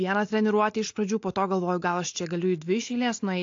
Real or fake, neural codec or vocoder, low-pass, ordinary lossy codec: real; none; 7.2 kHz; AAC, 48 kbps